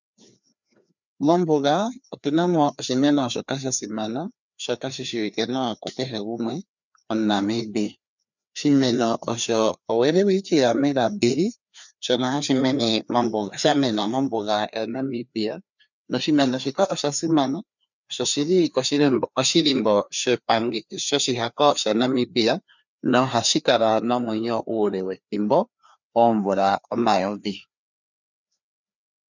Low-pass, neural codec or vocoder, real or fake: 7.2 kHz; codec, 16 kHz, 2 kbps, FreqCodec, larger model; fake